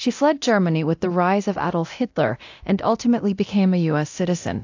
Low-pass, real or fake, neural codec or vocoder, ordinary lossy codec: 7.2 kHz; fake; codec, 24 kHz, 0.9 kbps, DualCodec; AAC, 48 kbps